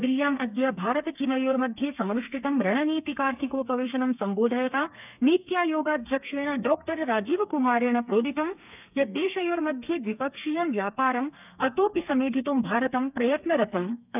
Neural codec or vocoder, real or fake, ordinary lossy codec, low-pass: codec, 32 kHz, 1.9 kbps, SNAC; fake; none; 3.6 kHz